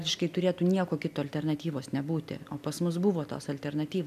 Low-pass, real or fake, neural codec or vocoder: 14.4 kHz; real; none